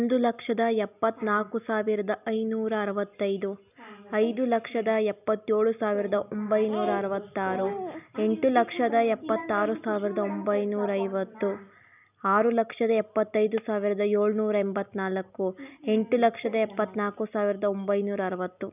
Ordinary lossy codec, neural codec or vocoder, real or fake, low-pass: none; none; real; 3.6 kHz